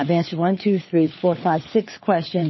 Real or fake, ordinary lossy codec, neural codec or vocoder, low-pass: fake; MP3, 24 kbps; codec, 16 kHz, 4 kbps, FunCodec, trained on LibriTTS, 50 frames a second; 7.2 kHz